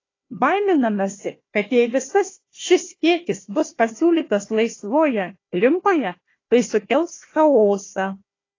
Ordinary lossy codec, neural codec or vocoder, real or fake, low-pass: AAC, 32 kbps; codec, 16 kHz, 1 kbps, FunCodec, trained on Chinese and English, 50 frames a second; fake; 7.2 kHz